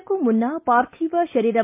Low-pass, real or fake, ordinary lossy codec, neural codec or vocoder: 3.6 kHz; real; MP3, 32 kbps; none